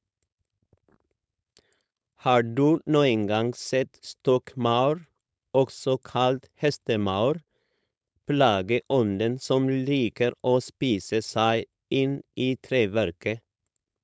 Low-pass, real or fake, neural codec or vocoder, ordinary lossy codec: none; fake; codec, 16 kHz, 4.8 kbps, FACodec; none